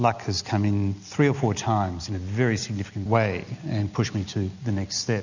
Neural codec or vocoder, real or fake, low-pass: none; real; 7.2 kHz